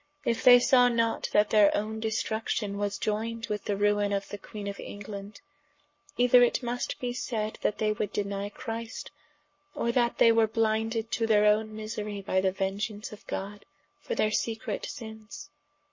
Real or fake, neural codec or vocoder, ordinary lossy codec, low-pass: fake; codec, 44.1 kHz, 7.8 kbps, Pupu-Codec; MP3, 32 kbps; 7.2 kHz